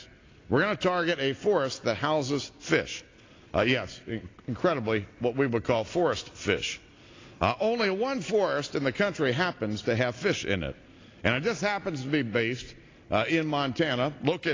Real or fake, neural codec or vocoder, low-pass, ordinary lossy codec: real; none; 7.2 kHz; AAC, 32 kbps